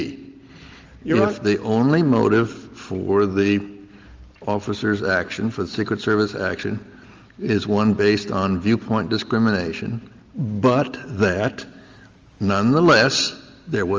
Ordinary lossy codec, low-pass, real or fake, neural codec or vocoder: Opus, 32 kbps; 7.2 kHz; real; none